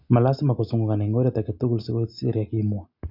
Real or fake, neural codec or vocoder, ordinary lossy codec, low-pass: real; none; MP3, 48 kbps; 5.4 kHz